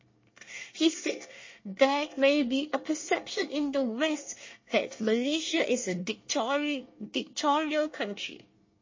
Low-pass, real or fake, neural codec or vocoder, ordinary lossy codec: 7.2 kHz; fake; codec, 24 kHz, 1 kbps, SNAC; MP3, 32 kbps